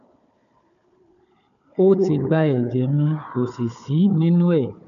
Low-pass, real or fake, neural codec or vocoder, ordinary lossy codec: 7.2 kHz; fake; codec, 16 kHz, 4 kbps, FunCodec, trained on Chinese and English, 50 frames a second; none